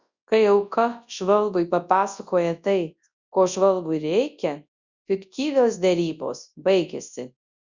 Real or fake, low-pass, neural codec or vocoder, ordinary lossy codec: fake; 7.2 kHz; codec, 24 kHz, 0.9 kbps, WavTokenizer, large speech release; Opus, 64 kbps